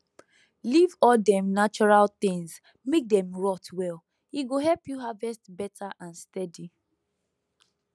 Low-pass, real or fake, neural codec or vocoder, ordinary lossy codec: none; real; none; none